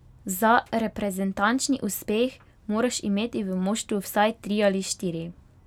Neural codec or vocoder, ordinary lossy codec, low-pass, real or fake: none; none; 19.8 kHz; real